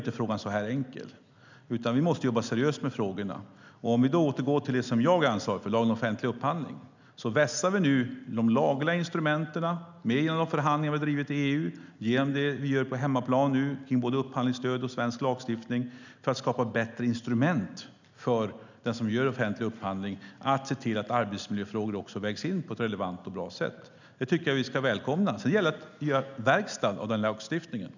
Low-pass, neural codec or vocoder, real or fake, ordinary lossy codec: 7.2 kHz; none; real; none